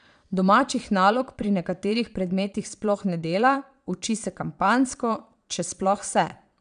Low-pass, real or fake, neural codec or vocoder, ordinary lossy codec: 9.9 kHz; fake; vocoder, 22.05 kHz, 80 mel bands, Vocos; none